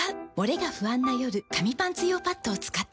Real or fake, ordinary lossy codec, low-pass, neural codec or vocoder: real; none; none; none